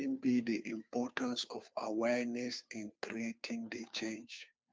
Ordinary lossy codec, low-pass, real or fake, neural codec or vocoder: none; none; fake; codec, 16 kHz, 2 kbps, FunCodec, trained on Chinese and English, 25 frames a second